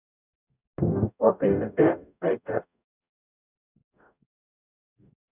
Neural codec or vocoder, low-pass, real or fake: codec, 44.1 kHz, 0.9 kbps, DAC; 3.6 kHz; fake